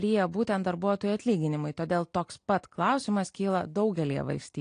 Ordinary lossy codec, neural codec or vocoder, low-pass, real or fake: AAC, 48 kbps; none; 9.9 kHz; real